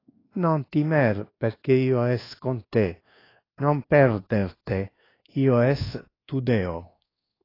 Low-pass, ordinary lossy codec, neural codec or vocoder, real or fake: 5.4 kHz; AAC, 24 kbps; codec, 24 kHz, 1.2 kbps, DualCodec; fake